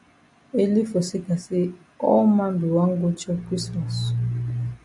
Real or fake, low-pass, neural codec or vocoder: real; 10.8 kHz; none